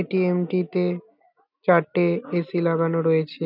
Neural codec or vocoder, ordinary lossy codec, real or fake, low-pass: none; none; real; 5.4 kHz